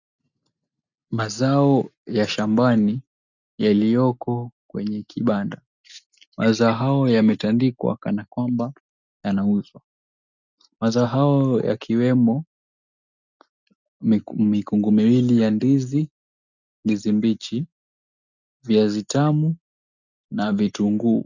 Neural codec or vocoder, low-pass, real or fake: none; 7.2 kHz; real